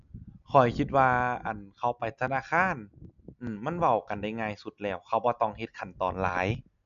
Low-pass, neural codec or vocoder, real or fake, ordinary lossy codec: 7.2 kHz; none; real; none